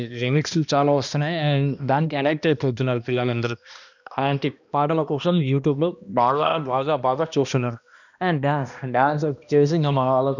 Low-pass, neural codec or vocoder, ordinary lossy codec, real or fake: 7.2 kHz; codec, 16 kHz, 1 kbps, X-Codec, HuBERT features, trained on balanced general audio; none; fake